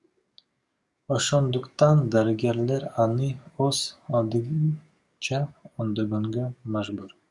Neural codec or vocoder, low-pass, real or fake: codec, 44.1 kHz, 7.8 kbps, DAC; 10.8 kHz; fake